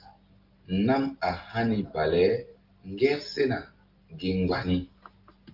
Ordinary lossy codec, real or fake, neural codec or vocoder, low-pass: Opus, 32 kbps; real; none; 5.4 kHz